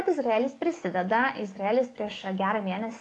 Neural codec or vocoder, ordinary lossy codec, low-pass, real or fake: codec, 44.1 kHz, 7.8 kbps, DAC; AAC, 48 kbps; 10.8 kHz; fake